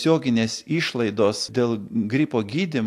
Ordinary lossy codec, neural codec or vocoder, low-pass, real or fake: AAC, 64 kbps; none; 14.4 kHz; real